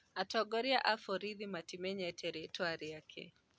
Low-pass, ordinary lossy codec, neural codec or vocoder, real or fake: none; none; none; real